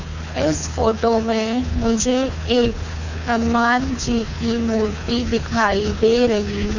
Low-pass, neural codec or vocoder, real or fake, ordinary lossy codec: 7.2 kHz; codec, 24 kHz, 3 kbps, HILCodec; fake; none